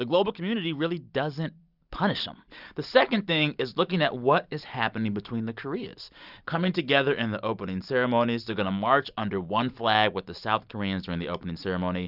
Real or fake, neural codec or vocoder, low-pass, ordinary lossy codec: fake; vocoder, 44.1 kHz, 128 mel bands every 256 samples, BigVGAN v2; 5.4 kHz; Opus, 64 kbps